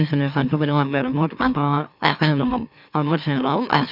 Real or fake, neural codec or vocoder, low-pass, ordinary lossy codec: fake; autoencoder, 44.1 kHz, a latent of 192 numbers a frame, MeloTTS; 5.4 kHz; none